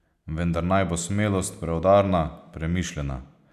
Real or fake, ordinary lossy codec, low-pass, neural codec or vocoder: real; none; 14.4 kHz; none